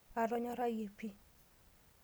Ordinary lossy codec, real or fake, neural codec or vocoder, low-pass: none; real; none; none